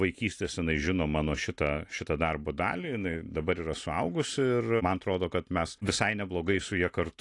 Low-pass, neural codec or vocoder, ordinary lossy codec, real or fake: 10.8 kHz; none; AAC, 48 kbps; real